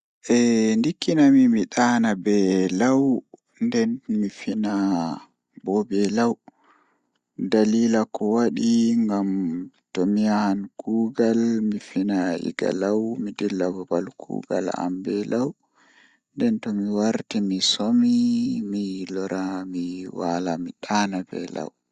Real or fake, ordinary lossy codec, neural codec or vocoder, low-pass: real; Opus, 64 kbps; none; 9.9 kHz